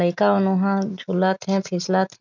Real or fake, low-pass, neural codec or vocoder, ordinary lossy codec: real; 7.2 kHz; none; none